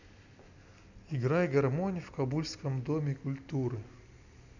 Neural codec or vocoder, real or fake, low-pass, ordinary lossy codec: none; real; 7.2 kHz; none